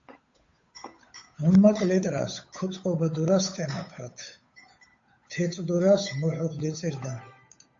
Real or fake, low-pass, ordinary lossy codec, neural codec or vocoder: fake; 7.2 kHz; AAC, 48 kbps; codec, 16 kHz, 8 kbps, FunCodec, trained on Chinese and English, 25 frames a second